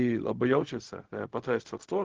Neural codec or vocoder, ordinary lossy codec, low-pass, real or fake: codec, 16 kHz, 0.4 kbps, LongCat-Audio-Codec; Opus, 16 kbps; 7.2 kHz; fake